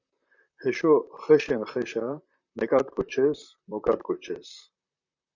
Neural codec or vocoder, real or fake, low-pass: vocoder, 44.1 kHz, 128 mel bands, Pupu-Vocoder; fake; 7.2 kHz